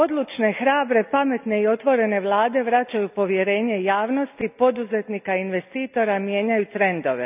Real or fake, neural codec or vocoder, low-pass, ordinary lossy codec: real; none; 3.6 kHz; none